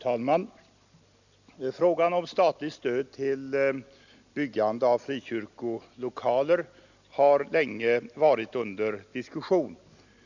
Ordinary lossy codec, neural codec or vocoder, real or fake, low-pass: none; none; real; 7.2 kHz